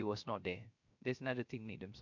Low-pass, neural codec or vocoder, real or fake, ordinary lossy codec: 7.2 kHz; codec, 16 kHz, 0.3 kbps, FocalCodec; fake; MP3, 64 kbps